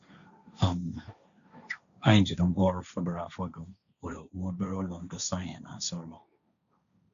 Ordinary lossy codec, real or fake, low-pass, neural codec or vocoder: none; fake; 7.2 kHz; codec, 16 kHz, 1.1 kbps, Voila-Tokenizer